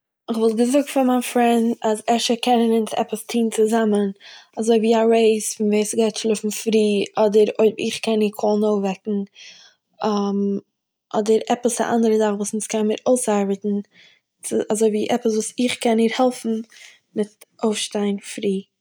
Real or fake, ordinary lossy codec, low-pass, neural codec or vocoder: real; none; none; none